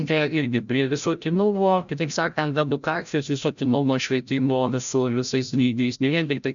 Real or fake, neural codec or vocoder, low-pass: fake; codec, 16 kHz, 0.5 kbps, FreqCodec, larger model; 7.2 kHz